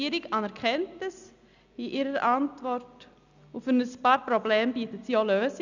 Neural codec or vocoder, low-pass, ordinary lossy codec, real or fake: none; 7.2 kHz; none; real